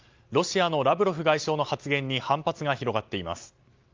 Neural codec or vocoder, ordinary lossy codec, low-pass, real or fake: none; Opus, 24 kbps; 7.2 kHz; real